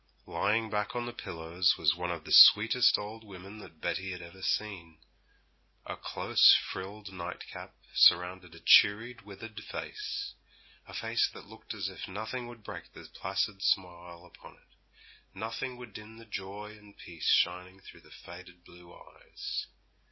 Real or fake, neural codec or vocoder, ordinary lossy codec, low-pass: real; none; MP3, 24 kbps; 7.2 kHz